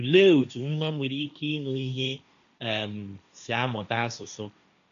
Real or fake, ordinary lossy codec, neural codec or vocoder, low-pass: fake; none; codec, 16 kHz, 1.1 kbps, Voila-Tokenizer; 7.2 kHz